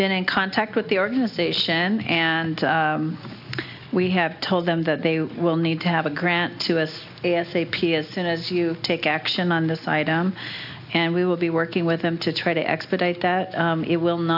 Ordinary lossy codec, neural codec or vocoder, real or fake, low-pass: AAC, 48 kbps; none; real; 5.4 kHz